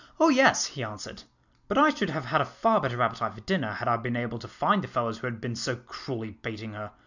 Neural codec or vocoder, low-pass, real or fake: none; 7.2 kHz; real